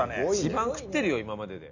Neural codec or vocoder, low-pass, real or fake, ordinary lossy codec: none; 7.2 kHz; real; none